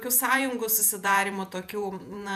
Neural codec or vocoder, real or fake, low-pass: vocoder, 48 kHz, 128 mel bands, Vocos; fake; 14.4 kHz